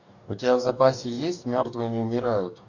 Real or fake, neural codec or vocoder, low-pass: fake; codec, 44.1 kHz, 2.6 kbps, DAC; 7.2 kHz